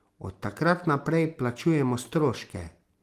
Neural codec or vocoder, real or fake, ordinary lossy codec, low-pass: none; real; Opus, 24 kbps; 14.4 kHz